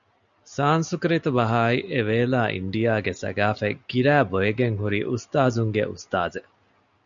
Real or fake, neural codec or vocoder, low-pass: real; none; 7.2 kHz